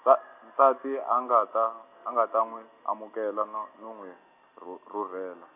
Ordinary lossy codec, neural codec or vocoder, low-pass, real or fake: none; none; 3.6 kHz; real